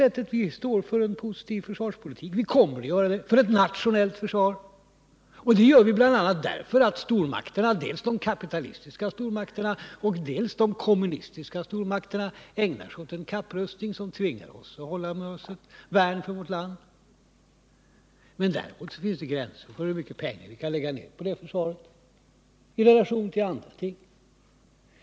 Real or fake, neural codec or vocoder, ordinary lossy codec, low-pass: real; none; none; none